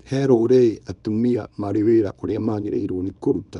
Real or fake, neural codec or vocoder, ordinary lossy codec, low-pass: fake; codec, 24 kHz, 0.9 kbps, WavTokenizer, small release; none; 10.8 kHz